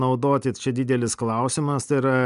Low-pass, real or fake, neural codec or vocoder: 10.8 kHz; real; none